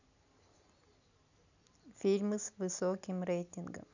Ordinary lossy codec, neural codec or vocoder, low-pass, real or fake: none; none; 7.2 kHz; real